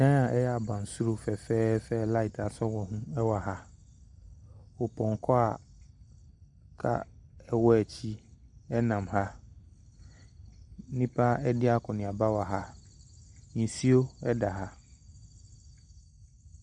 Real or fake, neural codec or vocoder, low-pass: real; none; 10.8 kHz